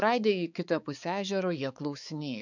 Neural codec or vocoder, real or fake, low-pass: codec, 16 kHz, 4 kbps, X-Codec, HuBERT features, trained on balanced general audio; fake; 7.2 kHz